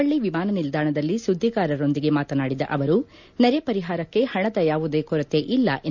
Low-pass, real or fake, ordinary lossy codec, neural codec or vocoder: 7.2 kHz; real; none; none